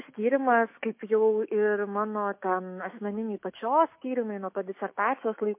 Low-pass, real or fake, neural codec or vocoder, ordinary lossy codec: 3.6 kHz; fake; autoencoder, 48 kHz, 128 numbers a frame, DAC-VAE, trained on Japanese speech; MP3, 24 kbps